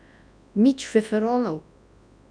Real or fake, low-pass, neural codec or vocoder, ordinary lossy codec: fake; 9.9 kHz; codec, 24 kHz, 0.9 kbps, WavTokenizer, large speech release; none